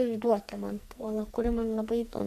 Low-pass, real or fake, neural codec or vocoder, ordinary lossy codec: 14.4 kHz; fake; codec, 44.1 kHz, 2.6 kbps, SNAC; MP3, 64 kbps